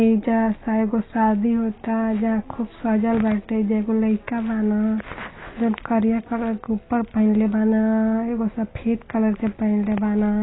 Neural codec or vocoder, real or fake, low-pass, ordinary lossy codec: none; real; 7.2 kHz; AAC, 16 kbps